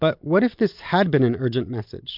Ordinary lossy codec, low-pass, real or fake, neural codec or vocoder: MP3, 48 kbps; 5.4 kHz; real; none